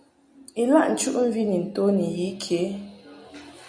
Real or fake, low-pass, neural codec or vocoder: real; 9.9 kHz; none